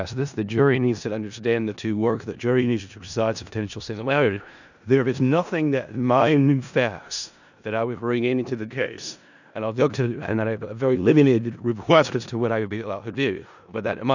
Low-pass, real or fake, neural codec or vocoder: 7.2 kHz; fake; codec, 16 kHz in and 24 kHz out, 0.4 kbps, LongCat-Audio-Codec, four codebook decoder